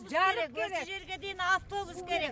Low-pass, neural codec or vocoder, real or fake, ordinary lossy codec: none; none; real; none